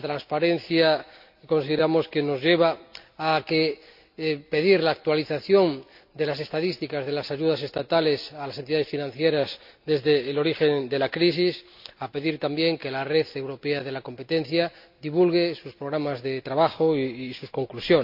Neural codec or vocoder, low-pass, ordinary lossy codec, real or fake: none; 5.4 kHz; MP3, 48 kbps; real